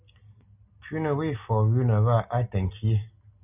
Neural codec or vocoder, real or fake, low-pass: none; real; 3.6 kHz